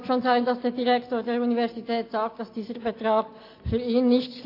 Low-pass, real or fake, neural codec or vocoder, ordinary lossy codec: 5.4 kHz; real; none; AAC, 32 kbps